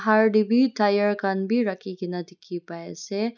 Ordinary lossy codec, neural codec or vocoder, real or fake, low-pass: none; none; real; 7.2 kHz